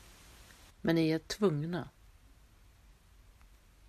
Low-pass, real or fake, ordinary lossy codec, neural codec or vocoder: 14.4 kHz; real; MP3, 96 kbps; none